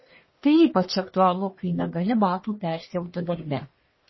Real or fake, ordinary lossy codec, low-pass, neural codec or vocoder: fake; MP3, 24 kbps; 7.2 kHz; codec, 44.1 kHz, 1.7 kbps, Pupu-Codec